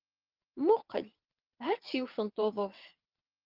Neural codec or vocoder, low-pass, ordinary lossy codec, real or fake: vocoder, 22.05 kHz, 80 mel bands, WaveNeXt; 5.4 kHz; Opus, 32 kbps; fake